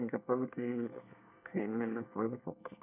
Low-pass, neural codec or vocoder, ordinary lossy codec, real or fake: 3.6 kHz; codec, 24 kHz, 1 kbps, SNAC; none; fake